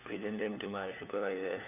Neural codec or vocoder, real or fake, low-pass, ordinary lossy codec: codec, 16 kHz, 4 kbps, FunCodec, trained on LibriTTS, 50 frames a second; fake; 3.6 kHz; none